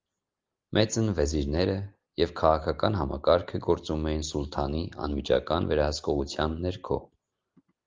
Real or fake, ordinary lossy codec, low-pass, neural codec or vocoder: real; Opus, 24 kbps; 7.2 kHz; none